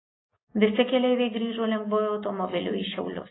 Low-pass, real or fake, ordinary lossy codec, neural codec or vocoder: 7.2 kHz; real; AAC, 16 kbps; none